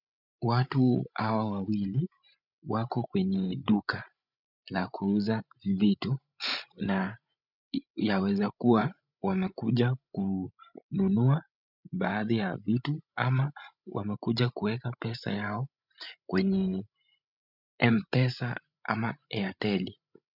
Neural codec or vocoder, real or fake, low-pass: codec, 16 kHz, 16 kbps, FreqCodec, larger model; fake; 5.4 kHz